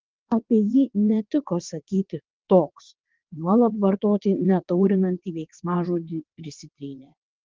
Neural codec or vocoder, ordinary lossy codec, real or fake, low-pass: vocoder, 22.05 kHz, 80 mel bands, WaveNeXt; Opus, 16 kbps; fake; 7.2 kHz